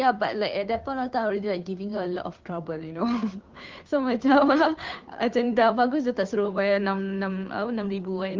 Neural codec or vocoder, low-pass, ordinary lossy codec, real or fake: codec, 16 kHz, 2 kbps, FunCodec, trained on Chinese and English, 25 frames a second; 7.2 kHz; Opus, 32 kbps; fake